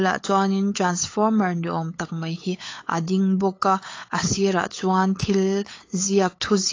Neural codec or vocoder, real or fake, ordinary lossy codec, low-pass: codec, 16 kHz, 8 kbps, FunCodec, trained on LibriTTS, 25 frames a second; fake; AAC, 32 kbps; 7.2 kHz